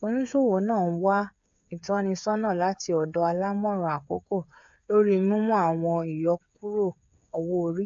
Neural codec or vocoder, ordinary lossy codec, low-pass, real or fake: codec, 16 kHz, 8 kbps, FreqCodec, smaller model; AAC, 64 kbps; 7.2 kHz; fake